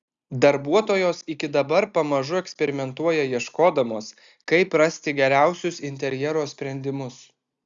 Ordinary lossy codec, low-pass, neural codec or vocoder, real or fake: Opus, 64 kbps; 7.2 kHz; none; real